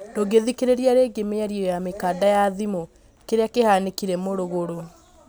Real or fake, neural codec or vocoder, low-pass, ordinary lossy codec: real; none; none; none